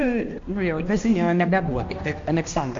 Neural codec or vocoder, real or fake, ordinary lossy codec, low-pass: codec, 16 kHz, 1 kbps, X-Codec, HuBERT features, trained on balanced general audio; fake; MP3, 48 kbps; 7.2 kHz